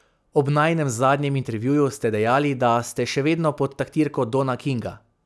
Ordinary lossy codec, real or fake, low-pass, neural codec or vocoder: none; real; none; none